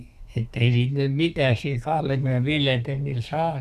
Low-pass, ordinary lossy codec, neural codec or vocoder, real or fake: 14.4 kHz; none; codec, 32 kHz, 1.9 kbps, SNAC; fake